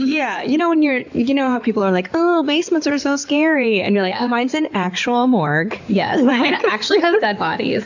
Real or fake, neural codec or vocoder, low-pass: fake; codec, 16 kHz in and 24 kHz out, 2.2 kbps, FireRedTTS-2 codec; 7.2 kHz